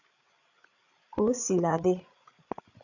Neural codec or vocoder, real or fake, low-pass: codec, 16 kHz, 8 kbps, FreqCodec, larger model; fake; 7.2 kHz